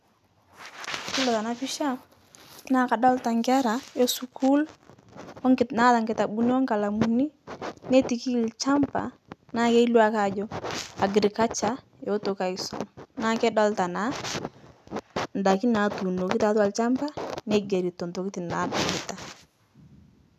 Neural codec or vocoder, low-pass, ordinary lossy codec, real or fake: none; 14.4 kHz; none; real